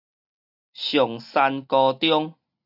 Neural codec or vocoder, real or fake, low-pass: none; real; 5.4 kHz